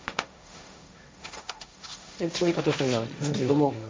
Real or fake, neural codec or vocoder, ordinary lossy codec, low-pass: fake; codec, 16 kHz, 1.1 kbps, Voila-Tokenizer; none; none